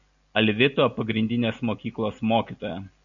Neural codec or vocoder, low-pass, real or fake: none; 7.2 kHz; real